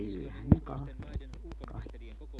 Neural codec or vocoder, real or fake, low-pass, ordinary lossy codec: none; real; none; none